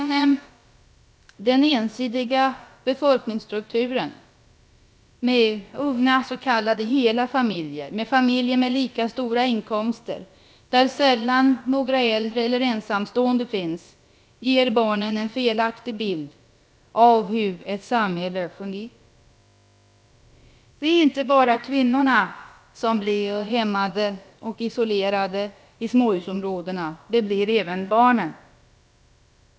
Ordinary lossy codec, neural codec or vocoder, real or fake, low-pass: none; codec, 16 kHz, about 1 kbps, DyCAST, with the encoder's durations; fake; none